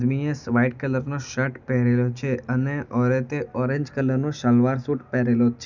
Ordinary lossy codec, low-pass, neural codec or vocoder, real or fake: none; 7.2 kHz; none; real